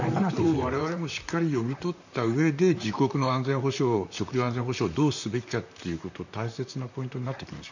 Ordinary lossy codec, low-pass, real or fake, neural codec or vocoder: none; 7.2 kHz; fake; vocoder, 44.1 kHz, 128 mel bands, Pupu-Vocoder